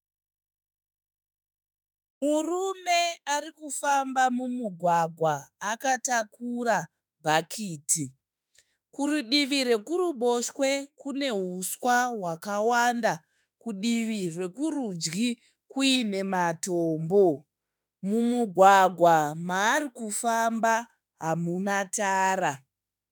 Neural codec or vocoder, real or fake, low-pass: autoencoder, 48 kHz, 32 numbers a frame, DAC-VAE, trained on Japanese speech; fake; 19.8 kHz